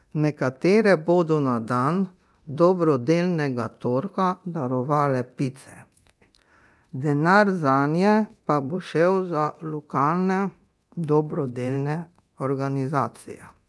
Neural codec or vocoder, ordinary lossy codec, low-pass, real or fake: codec, 24 kHz, 0.9 kbps, DualCodec; none; none; fake